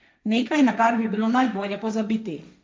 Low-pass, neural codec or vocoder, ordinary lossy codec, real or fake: none; codec, 16 kHz, 1.1 kbps, Voila-Tokenizer; none; fake